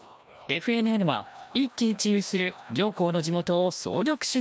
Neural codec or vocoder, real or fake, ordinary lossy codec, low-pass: codec, 16 kHz, 1 kbps, FreqCodec, larger model; fake; none; none